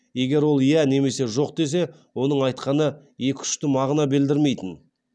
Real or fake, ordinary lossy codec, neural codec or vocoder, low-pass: real; none; none; none